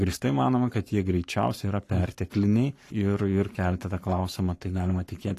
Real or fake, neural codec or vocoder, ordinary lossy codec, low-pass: fake; codec, 44.1 kHz, 7.8 kbps, Pupu-Codec; AAC, 48 kbps; 14.4 kHz